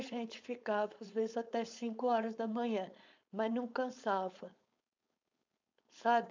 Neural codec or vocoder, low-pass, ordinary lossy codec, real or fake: codec, 16 kHz, 4.8 kbps, FACodec; 7.2 kHz; MP3, 64 kbps; fake